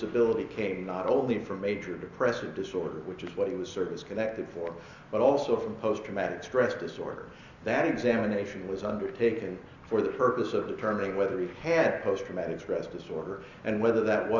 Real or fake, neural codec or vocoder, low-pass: real; none; 7.2 kHz